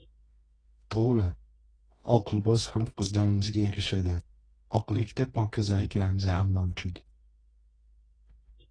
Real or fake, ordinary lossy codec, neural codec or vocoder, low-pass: fake; AAC, 32 kbps; codec, 24 kHz, 0.9 kbps, WavTokenizer, medium music audio release; 9.9 kHz